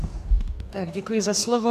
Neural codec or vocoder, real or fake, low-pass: codec, 44.1 kHz, 2.6 kbps, DAC; fake; 14.4 kHz